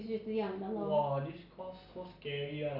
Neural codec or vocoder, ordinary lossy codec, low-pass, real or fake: none; none; 5.4 kHz; real